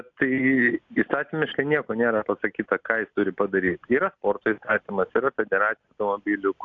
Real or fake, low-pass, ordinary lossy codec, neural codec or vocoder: real; 7.2 kHz; AAC, 64 kbps; none